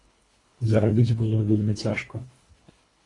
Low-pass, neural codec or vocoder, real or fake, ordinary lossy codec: 10.8 kHz; codec, 24 kHz, 1.5 kbps, HILCodec; fake; AAC, 32 kbps